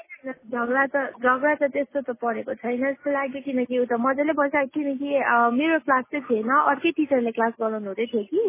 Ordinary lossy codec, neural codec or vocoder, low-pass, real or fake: MP3, 16 kbps; none; 3.6 kHz; real